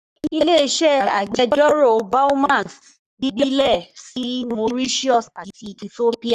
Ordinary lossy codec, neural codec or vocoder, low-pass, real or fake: none; codec, 44.1 kHz, 3.4 kbps, Pupu-Codec; 14.4 kHz; fake